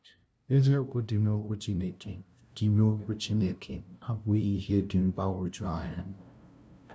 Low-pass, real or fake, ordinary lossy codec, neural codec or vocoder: none; fake; none; codec, 16 kHz, 0.5 kbps, FunCodec, trained on LibriTTS, 25 frames a second